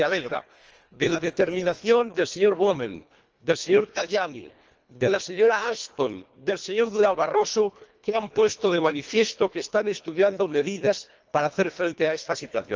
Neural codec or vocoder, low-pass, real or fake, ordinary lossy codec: codec, 24 kHz, 1.5 kbps, HILCodec; 7.2 kHz; fake; Opus, 24 kbps